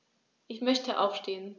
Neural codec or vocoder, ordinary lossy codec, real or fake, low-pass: none; none; real; none